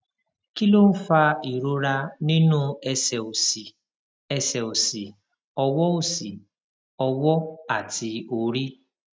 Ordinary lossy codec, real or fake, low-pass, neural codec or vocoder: none; real; none; none